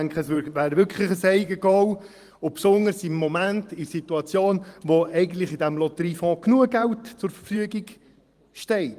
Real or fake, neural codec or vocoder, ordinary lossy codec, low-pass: fake; vocoder, 44.1 kHz, 128 mel bands every 512 samples, BigVGAN v2; Opus, 24 kbps; 14.4 kHz